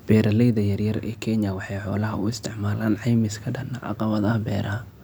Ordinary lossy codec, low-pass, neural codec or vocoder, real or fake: none; none; none; real